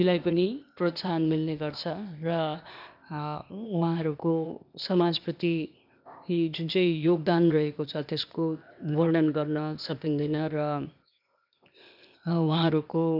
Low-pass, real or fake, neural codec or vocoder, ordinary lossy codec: 5.4 kHz; fake; codec, 16 kHz, 0.8 kbps, ZipCodec; none